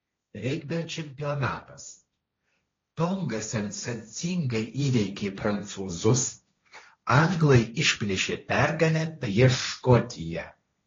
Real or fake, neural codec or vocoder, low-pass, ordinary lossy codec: fake; codec, 16 kHz, 1.1 kbps, Voila-Tokenizer; 7.2 kHz; AAC, 32 kbps